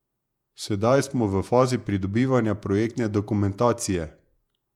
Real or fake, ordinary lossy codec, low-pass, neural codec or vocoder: fake; none; 19.8 kHz; vocoder, 48 kHz, 128 mel bands, Vocos